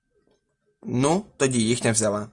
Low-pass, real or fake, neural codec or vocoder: 10.8 kHz; real; none